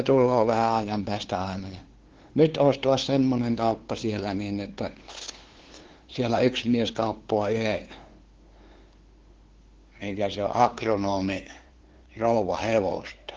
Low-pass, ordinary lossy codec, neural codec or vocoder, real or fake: 7.2 kHz; Opus, 16 kbps; codec, 16 kHz, 2 kbps, FunCodec, trained on LibriTTS, 25 frames a second; fake